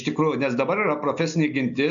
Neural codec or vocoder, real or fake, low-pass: none; real; 7.2 kHz